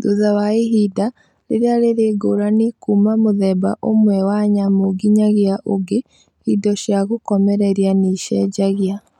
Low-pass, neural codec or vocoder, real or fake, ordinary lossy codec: 19.8 kHz; none; real; none